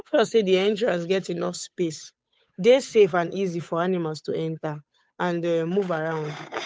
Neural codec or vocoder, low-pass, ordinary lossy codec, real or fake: codec, 16 kHz, 8 kbps, FunCodec, trained on Chinese and English, 25 frames a second; none; none; fake